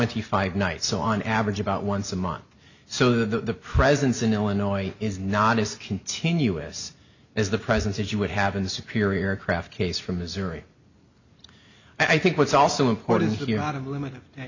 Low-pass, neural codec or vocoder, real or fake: 7.2 kHz; none; real